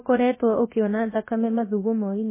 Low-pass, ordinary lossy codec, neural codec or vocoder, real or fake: 3.6 kHz; MP3, 16 kbps; codec, 16 kHz, 0.7 kbps, FocalCodec; fake